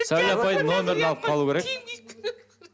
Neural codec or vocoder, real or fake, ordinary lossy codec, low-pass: none; real; none; none